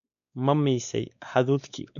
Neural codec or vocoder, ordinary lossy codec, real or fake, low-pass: codec, 16 kHz, 2 kbps, X-Codec, WavLM features, trained on Multilingual LibriSpeech; none; fake; 7.2 kHz